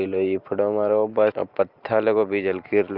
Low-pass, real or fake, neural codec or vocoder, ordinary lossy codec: 5.4 kHz; real; none; Opus, 24 kbps